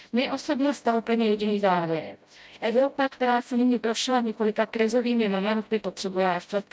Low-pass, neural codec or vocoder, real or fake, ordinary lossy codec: none; codec, 16 kHz, 0.5 kbps, FreqCodec, smaller model; fake; none